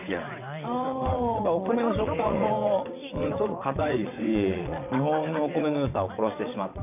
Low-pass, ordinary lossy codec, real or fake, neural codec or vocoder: 3.6 kHz; none; fake; vocoder, 22.05 kHz, 80 mel bands, WaveNeXt